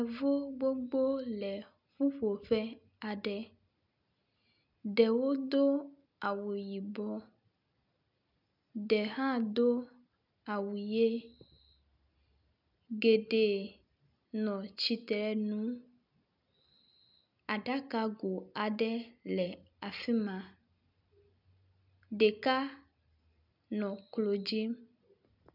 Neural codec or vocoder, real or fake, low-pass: none; real; 5.4 kHz